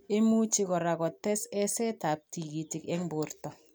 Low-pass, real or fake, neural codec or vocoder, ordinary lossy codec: none; real; none; none